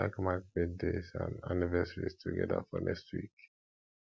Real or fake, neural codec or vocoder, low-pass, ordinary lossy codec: real; none; none; none